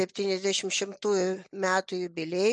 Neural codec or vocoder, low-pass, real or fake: none; 10.8 kHz; real